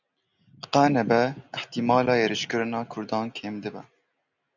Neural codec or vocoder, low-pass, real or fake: none; 7.2 kHz; real